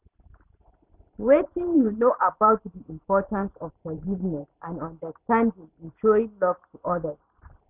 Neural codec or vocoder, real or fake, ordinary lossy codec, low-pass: vocoder, 44.1 kHz, 128 mel bands every 512 samples, BigVGAN v2; fake; none; 3.6 kHz